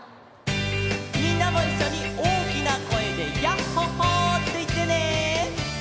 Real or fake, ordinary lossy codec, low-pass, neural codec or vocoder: real; none; none; none